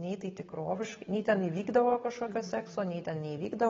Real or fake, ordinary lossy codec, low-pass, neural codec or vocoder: fake; AAC, 24 kbps; 7.2 kHz; codec, 16 kHz, 8 kbps, FunCodec, trained on Chinese and English, 25 frames a second